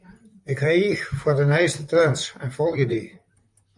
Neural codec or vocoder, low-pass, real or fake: vocoder, 44.1 kHz, 128 mel bands, Pupu-Vocoder; 10.8 kHz; fake